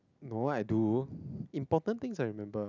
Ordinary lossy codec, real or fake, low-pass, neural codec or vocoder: none; real; 7.2 kHz; none